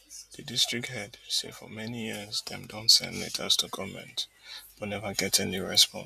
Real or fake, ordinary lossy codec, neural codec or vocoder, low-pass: real; none; none; 14.4 kHz